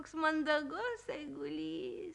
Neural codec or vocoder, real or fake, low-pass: none; real; 10.8 kHz